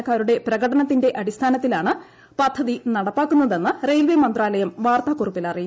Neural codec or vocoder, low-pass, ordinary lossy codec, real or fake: none; none; none; real